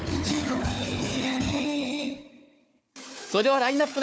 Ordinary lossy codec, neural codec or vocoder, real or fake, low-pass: none; codec, 16 kHz, 4 kbps, FunCodec, trained on Chinese and English, 50 frames a second; fake; none